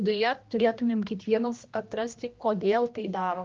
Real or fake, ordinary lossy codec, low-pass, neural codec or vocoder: fake; Opus, 24 kbps; 7.2 kHz; codec, 16 kHz, 1 kbps, X-Codec, HuBERT features, trained on general audio